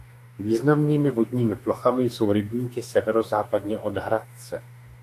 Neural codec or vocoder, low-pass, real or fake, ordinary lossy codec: autoencoder, 48 kHz, 32 numbers a frame, DAC-VAE, trained on Japanese speech; 14.4 kHz; fake; AAC, 64 kbps